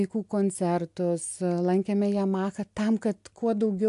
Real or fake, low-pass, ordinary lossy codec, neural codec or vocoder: real; 10.8 kHz; AAC, 64 kbps; none